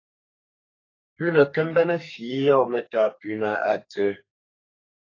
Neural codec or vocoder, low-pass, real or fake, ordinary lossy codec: codec, 32 kHz, 1.9 kbps, SNAC; 7.2 kHz; fake; AAC, 32 kbps